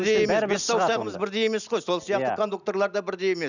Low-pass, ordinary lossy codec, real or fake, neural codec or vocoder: 7.2 kHz; none; real; none